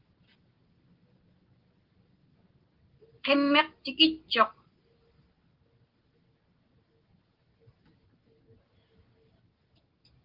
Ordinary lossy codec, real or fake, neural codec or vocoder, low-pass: Opus, 16 kbps; real; none; 5.4 kHz